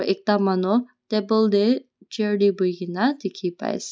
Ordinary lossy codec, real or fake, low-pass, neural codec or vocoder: none; real; none; none